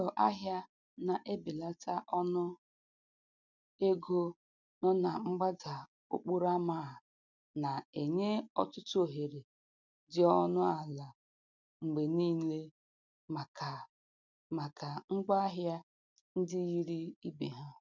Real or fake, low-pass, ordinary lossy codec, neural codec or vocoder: real; 7.2 kHz; none; none